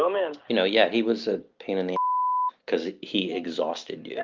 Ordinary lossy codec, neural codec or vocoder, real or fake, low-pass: Opus, 32 kbps; none; real; 7.2 kHz